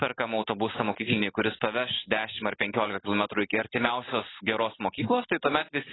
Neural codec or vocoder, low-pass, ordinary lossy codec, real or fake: none; 7.2 kHz; AAC, 16 kbps; real